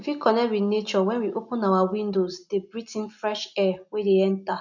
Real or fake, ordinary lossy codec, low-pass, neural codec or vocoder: real; none; 7.2 kHz; none